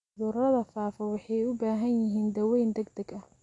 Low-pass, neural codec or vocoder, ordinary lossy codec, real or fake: 10.8 kHz; none; AAC, 48 kbps; real